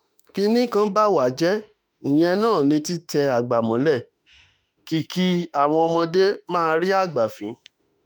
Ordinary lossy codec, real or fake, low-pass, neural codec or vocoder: none; fake; none; autoencoder, 48 kHz, 32 numbers a frame, DAC-VAE, trained on Japanese speech